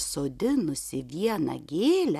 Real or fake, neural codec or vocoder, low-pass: real; none; 14.4 kHz